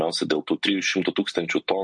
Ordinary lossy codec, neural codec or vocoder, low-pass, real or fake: MP3, 48 kbps; none; 10.8 kHz; real